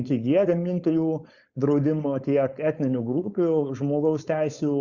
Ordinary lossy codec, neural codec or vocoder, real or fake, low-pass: Opus, 64 kbps; codec, 16 kHz, 4.8 kbps, FACodec; fake; 7.2 kHz